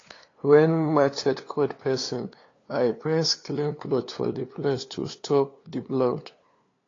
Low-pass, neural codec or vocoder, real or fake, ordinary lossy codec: 7.2 kHz; codec, 16 kHz, 2 kbps, FunCodec, trained on LibriTTS, 25 frames a second; fake; AAC, 32 kbps